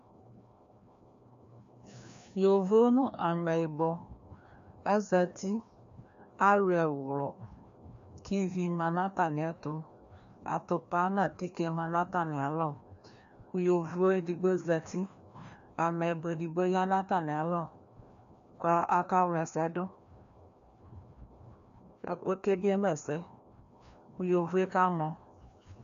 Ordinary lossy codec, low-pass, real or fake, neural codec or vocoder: MP3, 64 kbps; 7.2 kHz; fake; codec, 16 kHz, 1 kbps, FreqCodec, larger model